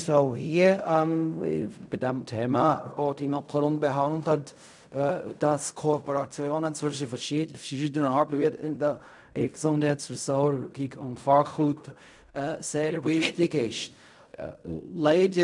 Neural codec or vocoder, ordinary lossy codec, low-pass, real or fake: codec, 16 kHz in and 24 kHz out, 0.4 kbps, LongCat-Audio-Codec, fine tuned four codebook decoder; none; 10.8 kHz; fake